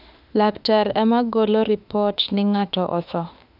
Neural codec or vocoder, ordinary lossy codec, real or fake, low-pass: autoencoder, 48 kHz, 32 numbers a frame, DAC-VAE, trained on Japanese speech; none; fake; 5.4 kHz